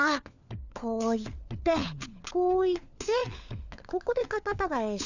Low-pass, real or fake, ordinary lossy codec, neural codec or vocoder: 7.2 kHz; fake; none; codec, 16 kHz, 4 kbps, FunCodec, trained on LibriTTS, 50 frames a second